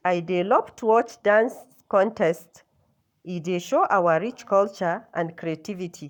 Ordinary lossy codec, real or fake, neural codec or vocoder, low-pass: none; fake; codec, 44.1 kHz, 7.8 kbps, Pupu-Codec; 19.8 kHz